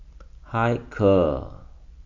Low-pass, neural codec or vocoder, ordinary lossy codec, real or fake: 7.2 kHz; none; none; real